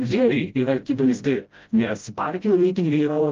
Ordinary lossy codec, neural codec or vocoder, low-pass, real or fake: Opus, 24 kbps; codec, 16 kHz, 0.5 kbps, FreqCodec, smaller model; 7.2 kHz; fake